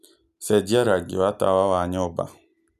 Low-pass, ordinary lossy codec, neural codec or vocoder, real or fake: 14.4 kHz; none; none; real